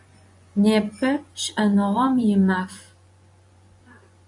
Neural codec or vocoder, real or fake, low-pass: vocoder, 24 kHz, 100 mel bands, Vocos; fake; 10.8 kHz